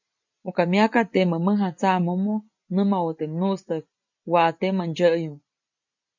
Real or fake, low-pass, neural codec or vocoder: real; 7.2 kHz; none